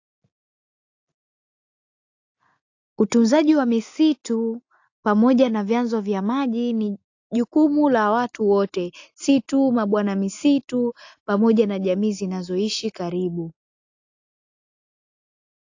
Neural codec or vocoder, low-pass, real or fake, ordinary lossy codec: none; 7.2 kHz; real; AAC, 48 kbps